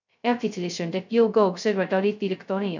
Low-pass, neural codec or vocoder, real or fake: 7.2 kHz; codec, 16 kHz, 0.2 kbps, FocalCodec; fake